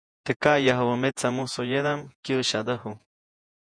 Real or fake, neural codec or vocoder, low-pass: fake; vocoder, 48 kHz, 128 mel bands, Vocos; 9.9 kHz